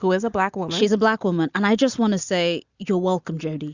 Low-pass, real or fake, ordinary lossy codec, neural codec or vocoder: 7.2 kHz; real; Opus, 64 kbps; none